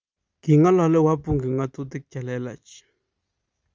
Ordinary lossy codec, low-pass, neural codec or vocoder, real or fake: Opus, 32 kbps; 7.2 kHz; none; real